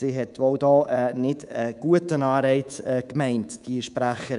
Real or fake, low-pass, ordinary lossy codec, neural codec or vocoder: fake; 10.8 kHz; none; codec, 24 kHz, 3.1 kbps, DualCodec